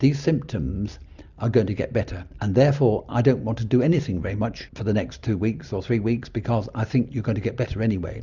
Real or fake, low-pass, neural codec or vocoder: real; 7.2 kHz; none